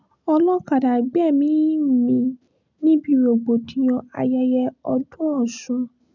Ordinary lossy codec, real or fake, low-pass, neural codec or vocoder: none; real; 7.2 kHz; none